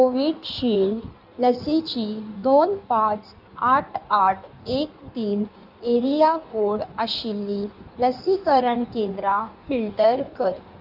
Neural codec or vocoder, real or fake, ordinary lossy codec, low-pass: codec, 16 kHz in and 24 kHz out, 1.1 kbps, FireRedTTS-2 codec; fake; none; 5.4 kHz